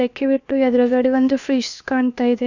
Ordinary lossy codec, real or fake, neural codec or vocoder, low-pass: none; fake; codec, 16 kHz, about 1 kbps, DyCAST, with the encoder's durations; 7.2 kHz